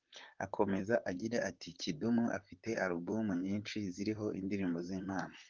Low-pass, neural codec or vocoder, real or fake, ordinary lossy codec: 7.2 kHz; vocoder, 44.1 kHz, 128 mel bands, Pupu-Vocoder; fake; Opus, 24 kbps